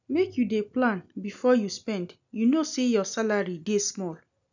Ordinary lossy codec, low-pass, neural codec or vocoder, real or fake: none; 7.2 kHz; none; real